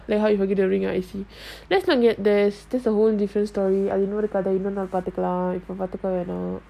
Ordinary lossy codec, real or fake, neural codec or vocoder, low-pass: none; real; none; 14.4 kHz